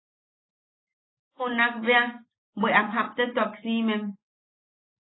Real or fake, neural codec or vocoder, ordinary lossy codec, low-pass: real; none; AAC, 16 kbps; 7.2 kHz